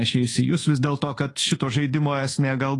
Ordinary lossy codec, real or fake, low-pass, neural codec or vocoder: AAC, 32 kbps; fake; 10.8 kHz; codec, 24 kHz, 1.2 kbps, DualCodec